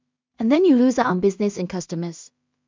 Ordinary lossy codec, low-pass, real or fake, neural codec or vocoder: MP3, 64 kbps; 7.2 kHz; fake; codec, 16 kHz in and 24 kHz out, 0.4 kbps, LongCat-Audio-Codec, two codebook decoder